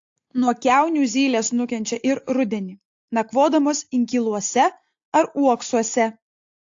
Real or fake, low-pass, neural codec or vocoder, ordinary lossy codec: real; 7.2 kHz; none; AAC, 48 kbps